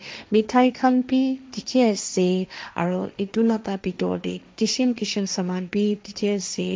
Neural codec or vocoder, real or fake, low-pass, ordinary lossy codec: codec, 16 kHz, 1.1 kbps, Voila-Tokenizer; fake; none; none